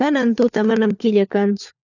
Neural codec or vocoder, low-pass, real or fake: codec, 16 kHz, 4 kbps, FunCodec, trained on LibriTTS, 50 frames a second; 7.2 kHz; fake